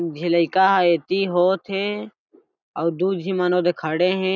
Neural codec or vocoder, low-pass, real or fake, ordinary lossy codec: none; none; real; none